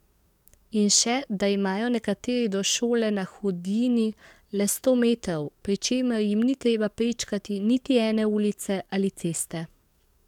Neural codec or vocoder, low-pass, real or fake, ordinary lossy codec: codec, 44.1 kHz, 7.8 kbps, DAC; 19.8 kHz; fake; none